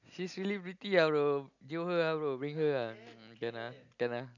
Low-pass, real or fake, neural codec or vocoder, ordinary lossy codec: 7.2 kHz; real; none; none